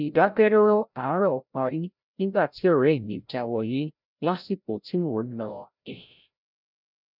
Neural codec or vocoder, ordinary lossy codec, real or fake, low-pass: codec, 16 kHz, 0.5 kbps, FreqCodec, larger model; none; fake; 5.4 kHz